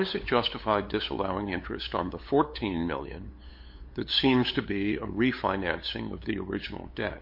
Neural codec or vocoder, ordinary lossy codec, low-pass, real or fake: codec, 16 kHz, 8 kbps, FunCodec, trained on LibriTTS, 25 frames a second; MP3, 32 kbps; 5.4 kHz; fake